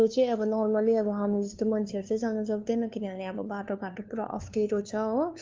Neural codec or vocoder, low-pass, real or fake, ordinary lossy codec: codec, 16 kHz, 2 kbps, FunCodec, trained on LibriTTS, 25 frames a second; 7.2 kHz; fake; Opus, 32 kbps